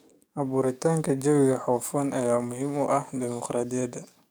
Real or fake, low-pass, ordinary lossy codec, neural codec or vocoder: fake; none; none; codec, 44.1 kHz, 7.8 kbps, DAC